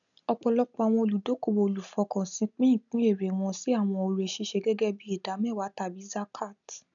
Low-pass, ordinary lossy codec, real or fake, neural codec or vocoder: 7.2 kHz; none; real; none